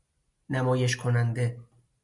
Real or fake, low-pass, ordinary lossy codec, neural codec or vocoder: real; 10.8 kHz; MP3, 64 kbps; none